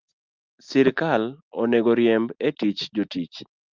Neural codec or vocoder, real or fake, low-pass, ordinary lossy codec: none; real; 7.2 kHz; Opus, 24 kbps